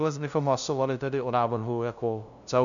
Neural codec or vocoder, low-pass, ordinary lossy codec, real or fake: codec, 16 kHz, 0.5 kbps, FunCodec, trained on LibriTTS, 25 frames a second; 7.2 kHz; MP3, 96 kbps; fake